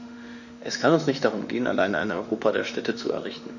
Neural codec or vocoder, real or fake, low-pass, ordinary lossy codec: autoencoder, 48 kHz, 32 numbers a frame, DAC-VAE, trained on Japanese speech; fake; 7.2 kHz; none